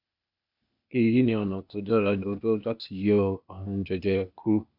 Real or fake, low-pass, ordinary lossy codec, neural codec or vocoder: fake; 5.4 kHz; none; codec, 16 kHz, 0.8 kbps, ZipCodec